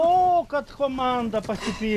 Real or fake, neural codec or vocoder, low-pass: real; none; 14.4 kHz